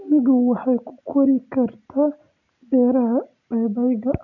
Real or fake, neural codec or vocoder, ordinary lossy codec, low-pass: real; none; none; 7.2 kHz